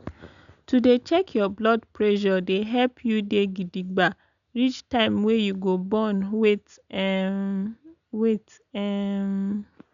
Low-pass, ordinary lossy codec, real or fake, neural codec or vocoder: 7.2 kHz; none; real; none